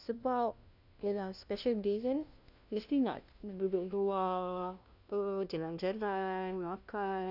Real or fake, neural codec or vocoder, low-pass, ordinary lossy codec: fake; codec, 16 kHz, 1 kbps, FunCodec, trained on LibriTTS, 50 frames a second; 5.4 kHz; none